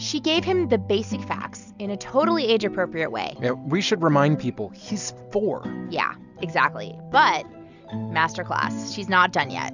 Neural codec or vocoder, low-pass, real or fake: none; 7.2 kHz; real